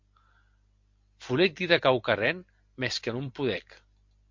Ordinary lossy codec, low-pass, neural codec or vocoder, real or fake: MP3, 48 kbps; 7.2 kHz; none; real